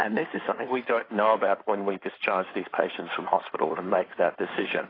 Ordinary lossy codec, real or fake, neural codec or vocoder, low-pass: AAC, 24 kbps; fake; codec, 16 kHz in and 24 kHz out, 2.2 kbps, FireRedTTS-2 codec; 5.4 kHz